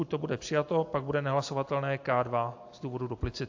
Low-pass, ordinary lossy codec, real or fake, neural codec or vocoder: 7.2 kHz; AAC, 48 kbps; real; none